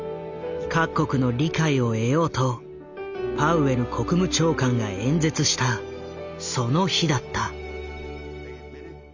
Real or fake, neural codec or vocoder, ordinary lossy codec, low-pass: real; none; Opus, 64 kbps; 7.2 kHz